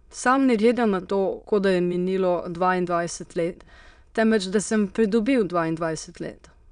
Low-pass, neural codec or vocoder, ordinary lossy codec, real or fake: 9.9 kHz; autoencoder, 22.05 kHz, a latent of 192 numbers a frame, VITS, trained on many speakers; none; fake